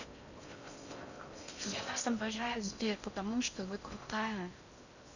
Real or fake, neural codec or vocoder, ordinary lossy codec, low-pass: fake; codec, 16 kHz in and 24 kHz out, 0.6 kbps, FocalCodec, streaming, 4096 codes; none; 7.2 kHz